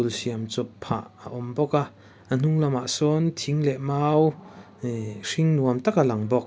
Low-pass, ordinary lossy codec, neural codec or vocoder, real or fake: none; none; none; real